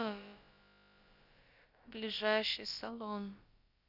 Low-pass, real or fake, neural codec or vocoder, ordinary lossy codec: 5.4 kHz; fake; codec, 16 kHz, about 1 kbps, DyCAST, with the encoder's durations; Opus, 64 kbps